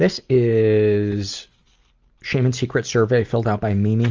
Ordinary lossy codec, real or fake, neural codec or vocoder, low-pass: Opus, 32 kbps; real; none; 7.2 kHz